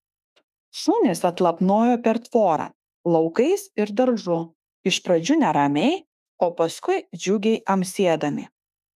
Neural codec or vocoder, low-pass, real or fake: autoencoder, 48 kHz, 32 numbers a frame, DAC-VAE, trained on Japanese speech; 14.4 kHz; fake